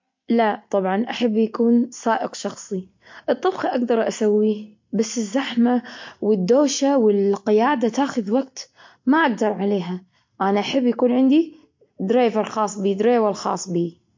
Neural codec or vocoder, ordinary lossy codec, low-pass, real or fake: none; MP3, 48 kbps; 7.2 kHz; real